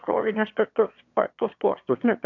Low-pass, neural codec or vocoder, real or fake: 7.2 kHz; autoencoder, 22.05 kHz, a latent of 192 numbers a frame, VITS, trained on one speaker; fake